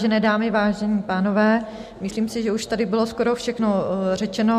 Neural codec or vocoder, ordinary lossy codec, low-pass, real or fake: none; MP3, 64 kbps; 14.4 kHz; real